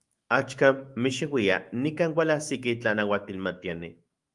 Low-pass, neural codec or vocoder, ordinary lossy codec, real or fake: 10.8 kHz; autoencoder, 48 kHz, 128 numbers a frame, DAC-VAE, trained on Japanese speech; Opus, 32 kbps; fake